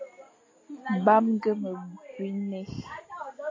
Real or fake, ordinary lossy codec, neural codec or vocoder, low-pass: real; AAC, 32 kbps; none; 7.2 kHz